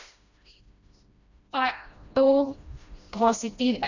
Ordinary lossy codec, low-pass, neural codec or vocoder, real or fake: none; 7.2 kHz; codec, 16 kHz, 1 kbps, FreqCodec, smaller model; fake